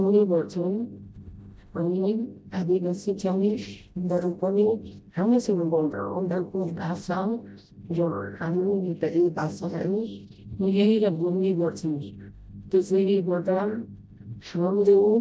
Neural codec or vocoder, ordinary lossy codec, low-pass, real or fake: codec, 16 kHz, 0.5 kbps, FreqCodec, smaller model; none; none; fake